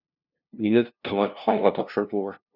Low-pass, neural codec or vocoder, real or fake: 5.4 kHz; codec, 16 kHz, 0.5 kbps, FunCodec, trained on LibriTTS, 25 frames a second; fake